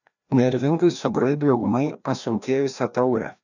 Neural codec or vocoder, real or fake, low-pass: codec, 16 kHz, 1 kbps, FreqCodec, larger model; fake; 7.2 kHz